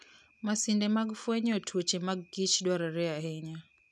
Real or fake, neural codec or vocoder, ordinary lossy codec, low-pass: real; none; none; none